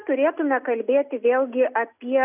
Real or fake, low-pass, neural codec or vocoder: real; 3.6 kHz; none